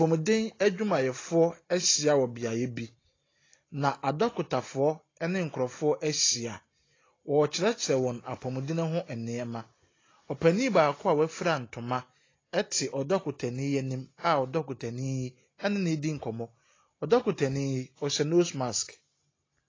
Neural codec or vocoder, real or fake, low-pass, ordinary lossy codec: none; real; 7.2 kHz; AAC, 32 kbps